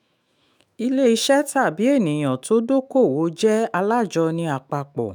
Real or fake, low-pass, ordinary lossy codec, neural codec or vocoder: fake; none; none; autoencoder, 48 kHz, 128 numbers a frame, DAC-VAE, trained on Japanese speech